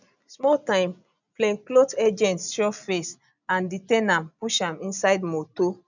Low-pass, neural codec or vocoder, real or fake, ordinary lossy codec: 7.2 kHz; none; real; none